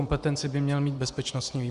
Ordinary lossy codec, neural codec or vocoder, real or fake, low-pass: Opus, 64 kbps; vocoder, 24 kHz, 100 mel bands, Vocos; fake; 10.8 kHz